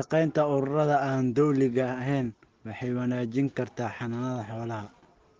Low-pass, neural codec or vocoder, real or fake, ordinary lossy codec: 7.2 kHz; none; real; Opus, 16 kbps